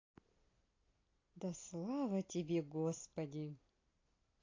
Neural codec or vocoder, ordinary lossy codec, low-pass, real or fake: none; AAC, 32 kbps; 7.2 kHz; real